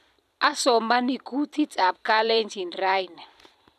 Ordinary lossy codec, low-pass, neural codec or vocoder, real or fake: none; 14.4 kHz; vocoder, 44.1 kHz, 128 mel bands every 256 samples, BigVGAN v2; fake